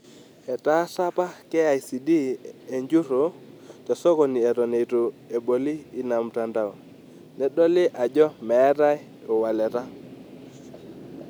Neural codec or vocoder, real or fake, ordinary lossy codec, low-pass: none; real; none; none